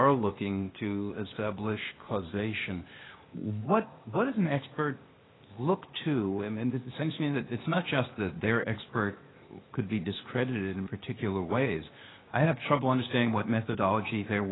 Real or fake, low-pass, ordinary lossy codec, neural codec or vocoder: fake; 7.2 kHz; AAC, 16 kbps; codec, 16 kHz, 0.8 kbps, ZipCodec